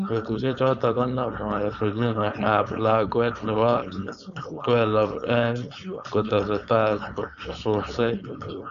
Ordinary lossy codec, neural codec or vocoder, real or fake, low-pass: none; codec, 16 kHz, 4.8 kbps, FACodec; fake; 7.2 kHz